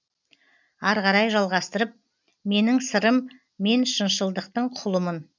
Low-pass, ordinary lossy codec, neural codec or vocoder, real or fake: 7.2 kHz; none; none; real